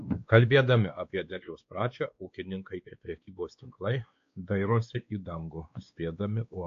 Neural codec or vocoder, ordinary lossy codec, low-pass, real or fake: codec, 16 kHz, 2 kbps, X-Codec, WavLM features, trained on Multilingual LibriSpeech; AAC, 48 kbps; 7.2 kHz; fake